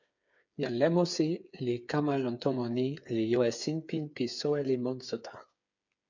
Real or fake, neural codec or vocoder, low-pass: fake; codec, 16 kHz, 8 kbps, FreqCodec, smaller model; 7.2 kHz